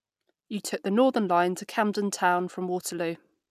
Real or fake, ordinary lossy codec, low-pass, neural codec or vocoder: real; none; 14.4 kHz; none